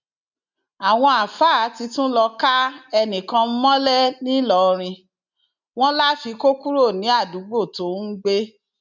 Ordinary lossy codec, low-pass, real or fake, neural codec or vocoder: none; 7.2 kHz; real; none